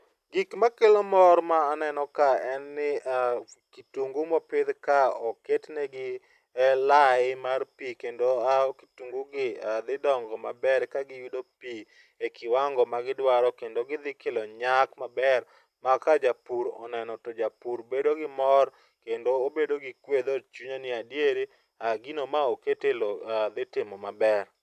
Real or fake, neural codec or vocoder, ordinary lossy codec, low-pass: real; none; AAC, 96 kbps; 14.4 kHz